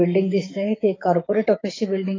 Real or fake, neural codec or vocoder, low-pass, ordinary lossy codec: fake; codec, 16 kHz, 6 kbps, DAC; 7.2 kHz; AAC, 32 kbps